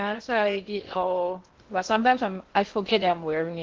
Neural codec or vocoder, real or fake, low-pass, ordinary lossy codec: codec, 16 kHz in and 24 kHz out, 0.6 kbps, FocalCodec, streaming, 2048 codes; fake; 7.2 kHz; Opus, 16 kbps